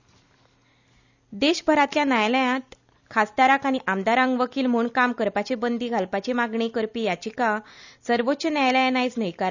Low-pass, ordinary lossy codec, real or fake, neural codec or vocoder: 7.2 kHz; none; real; none